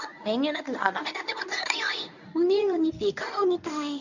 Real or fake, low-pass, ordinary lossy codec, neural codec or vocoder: fake; 7.2 kHz; none; codec, 24 kHz, 0.9 kbps, WavTokenizer, medium speech release version 1